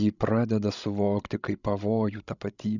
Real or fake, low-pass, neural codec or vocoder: fake; 7.2 kHz; codec, 16 kHz, 16 kbps, FreqCodec, larger model